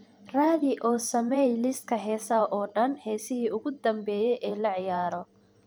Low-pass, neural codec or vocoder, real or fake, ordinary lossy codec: none; vocoder, 44.1 kHz, 128 mel bands every 512 samples, BigVGAN v2; fake; none